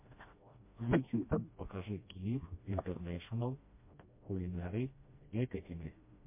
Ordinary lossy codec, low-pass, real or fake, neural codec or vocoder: MP3, 24 kbps; 3.6 kHz; fake; codec, 16 kHz, 1 kbps, FreqCodec, smaller model